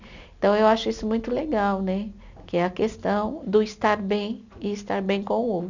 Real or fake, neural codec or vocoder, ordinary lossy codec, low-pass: real; none; none; 7.2 kHz